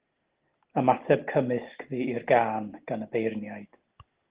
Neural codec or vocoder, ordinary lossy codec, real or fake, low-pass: none; Opus, 16 kbps; real; 3.6 kHz